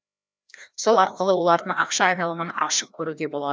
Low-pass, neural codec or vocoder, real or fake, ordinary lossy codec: none; codec, 16 kHz, 1 kbps, FreqCodec, larger model; fake; none